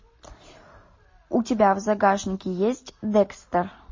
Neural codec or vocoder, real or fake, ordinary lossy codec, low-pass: none; real; MP3, 32 kbps; 7.2 kHz